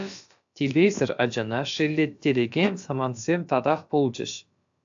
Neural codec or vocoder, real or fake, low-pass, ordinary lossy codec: codec, 16 kHz, about 1 kbps, DyCAST, with the encoder's durations; fake; 7.2 kHz; AAC, 64 kbps